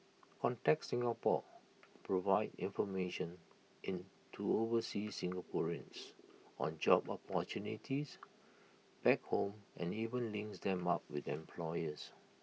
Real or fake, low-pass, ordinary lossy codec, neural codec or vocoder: real; none; none; none